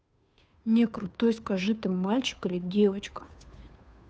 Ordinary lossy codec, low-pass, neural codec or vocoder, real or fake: none; none; codec, 16 kHz, 2 kbps, FunCodec, trained on Chinese and English, 25 frames a second; fake